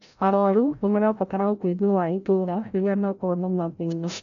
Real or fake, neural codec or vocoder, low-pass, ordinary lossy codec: fake; codec, 16 kHz, 0.5 kbps, FreqCodec, larger model; 7.2 kHz; none